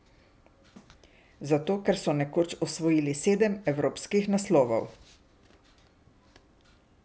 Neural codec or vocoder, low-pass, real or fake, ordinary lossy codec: none; none; real; none